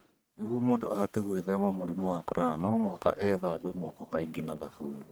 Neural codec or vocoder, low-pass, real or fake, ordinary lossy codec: codec, 44.1 kHz, 1.7 kbps, Pupu-Codec; none; fake; none